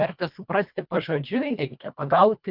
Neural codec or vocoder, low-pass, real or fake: codec, 24 kHz, 1.5 kbps, HILCodec; 5.4 kHz; fake